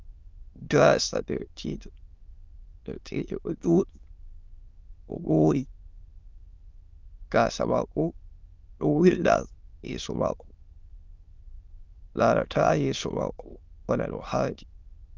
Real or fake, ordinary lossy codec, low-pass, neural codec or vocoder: fake; Opus, 24 kbps; 7.2 kHz; autoencoder, 22.05 kHz, a latent of 192 numbers a frame, VITS, trained on many speakers